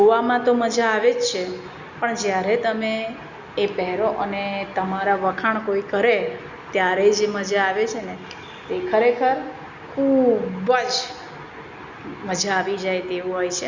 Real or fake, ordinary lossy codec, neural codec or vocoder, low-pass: real; Opus, 64 kbps; none; 7.2 kHz